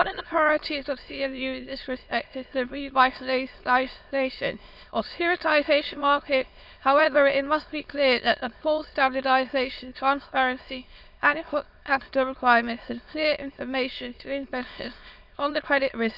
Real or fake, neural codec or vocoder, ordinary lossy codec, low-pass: fake; autoencoder, 22.05 kHz, a latent of 192 numbers a frame, VITS, trained on many speakers; none; 5.4 kHz